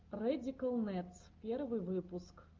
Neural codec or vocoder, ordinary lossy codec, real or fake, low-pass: none; Opus, 24 kbps; real; 7.2 kHz